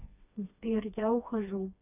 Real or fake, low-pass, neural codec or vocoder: fake; 3.6 kHz; codec, 16 kHz, 2 kbps, FreqCodec, smaller model